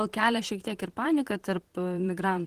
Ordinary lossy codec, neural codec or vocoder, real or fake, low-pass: Opus, 16 kbps; vocoder, 44.1 kHz, 128 mel bands, Pupu-Vocoder; fake; 14.4 kHz